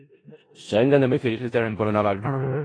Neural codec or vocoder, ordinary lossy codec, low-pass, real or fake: codec, 16 kHz in and 24 kHz out, 0.4 kbps, LongCat-Audio-Codec, four codebook decoder; AAC, 32 kbps; 9.9 kHz; fake